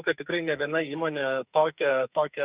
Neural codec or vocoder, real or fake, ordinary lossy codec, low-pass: codec, 44.1 kHz, 2.6 kbps, SNAC; fake; Opus, 64 kbps; 3.6 kHz